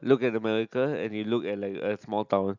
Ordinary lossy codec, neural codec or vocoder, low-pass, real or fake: none; none; 7.2 kHz; real